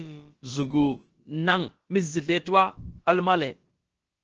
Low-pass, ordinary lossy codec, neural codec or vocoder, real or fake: 7.2 kHz; Opus, 16 kbps; codec, 16 kHz, about 1 kbps, DyCAST, with the encoder's durations; fake